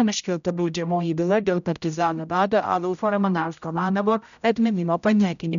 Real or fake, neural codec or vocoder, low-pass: fake; codec, 16 kHz, 0.5 kbps, X-Codec, HuBERT features, trained on general audio; 7.2 kHz